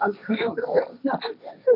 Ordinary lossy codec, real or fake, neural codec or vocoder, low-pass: MP3, 48 kbps; fake; codec, 16 kHz, 1.1 kbps, Voila-Tokenizer; 5.4 kHz